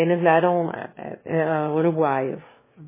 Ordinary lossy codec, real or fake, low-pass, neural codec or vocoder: MP3, 16 kbps; fake; 3.6 kHz; autoencoder, 22.05 kHz, a latent of 192 numbers a frame, VITS, trained on one speaker